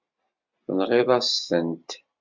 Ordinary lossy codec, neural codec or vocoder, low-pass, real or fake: MP3, 64 kbps; none; 7.2 kHz; real